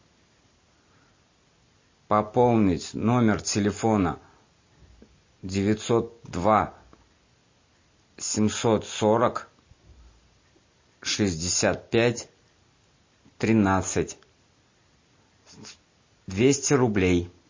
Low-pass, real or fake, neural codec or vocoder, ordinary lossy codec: 7.2 kHz; real; none; MP3, 32 kbps